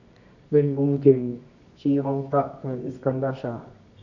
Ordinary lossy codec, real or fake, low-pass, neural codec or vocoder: none; fake; 7.2 kHz; codec, 24 kHz, 0.9 kbps, WavTokenizer, medium music audio release